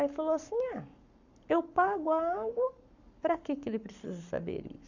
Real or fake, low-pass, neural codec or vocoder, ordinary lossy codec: fake; 7.2 kHz; codec, 44.1 kHz, 7.8 kbps, Pupu-Codec; none